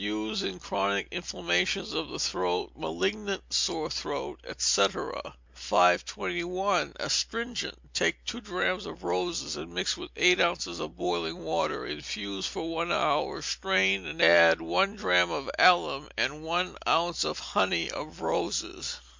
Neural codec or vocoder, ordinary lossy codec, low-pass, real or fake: none; MP3, 64 kbps; 7.2 kHz; real